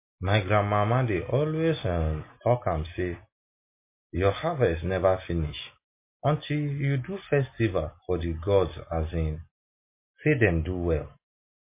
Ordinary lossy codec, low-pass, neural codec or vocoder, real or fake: MP3, 24 kbps; 3.6 kHz; none; real